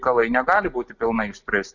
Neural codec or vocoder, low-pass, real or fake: none; 7.2 kHz; real